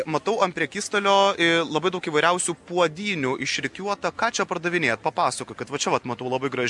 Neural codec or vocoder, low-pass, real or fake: none; 10.8 kHz; real